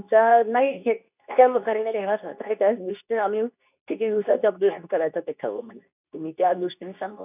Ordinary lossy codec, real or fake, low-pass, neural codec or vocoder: none; fake; 3.6 kHz; codec, 24 kHz, 0.9 kbps, WavTokenizer, medium speech release version 2